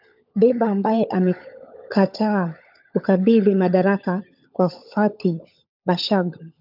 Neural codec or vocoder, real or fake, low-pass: codec, 16 kHz, 16 kbps, FunCodec, trained on LibriTTS, 50 frames a second; fake; 5.4 kHz